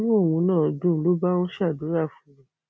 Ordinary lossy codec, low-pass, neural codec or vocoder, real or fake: none; none; none; real